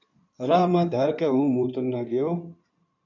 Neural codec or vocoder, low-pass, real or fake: codec, 16 kHz in and 24 kHz out, 2.2 kbps, FireRedTTS-2 codec; 7.2 kHz; fake